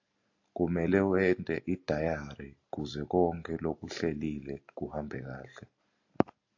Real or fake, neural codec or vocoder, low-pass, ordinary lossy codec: real; none; 7.2 kHz; AAC, 48 kbps